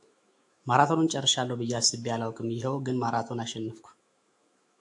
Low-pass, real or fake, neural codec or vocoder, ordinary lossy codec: 10.8 kHz; fake; autoencoder, 48 kHz, 128 numbers a frame, DAC-VAE, trained on Japanese speech; AAC, 64 kbps